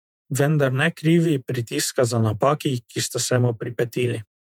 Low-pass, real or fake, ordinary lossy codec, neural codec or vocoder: 19.8 kHz; fake; MP3, 96 kbps; vocoder, 48 kHz, 128 mel bands, Vocos